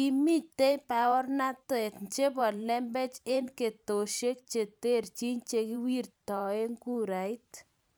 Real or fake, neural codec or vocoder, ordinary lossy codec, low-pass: fake; vocoder, 44.1 kHz, 128 mel bands every 512 samples, BigVGAN v2; none; none